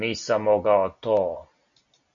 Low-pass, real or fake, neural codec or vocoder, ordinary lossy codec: 7.2 kHz; real; none; MP3, 96 kbps